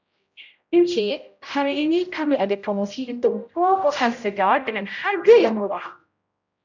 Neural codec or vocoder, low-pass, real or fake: codec, 16 kHz, 0.5 kbps, X-Codec, HuBERT features, trained on general audio; 7.2 kHz; fake